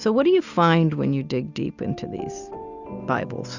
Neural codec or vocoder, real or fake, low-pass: none; real; 7.2 kHz